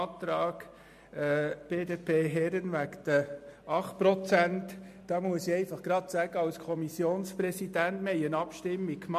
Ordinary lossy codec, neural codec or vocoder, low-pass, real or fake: MP3, 64 kbps; none; 14.4 kHz; real